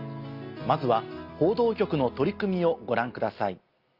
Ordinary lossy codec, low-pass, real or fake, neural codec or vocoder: Opus, 32 kbps; 5.4 kHz; real; none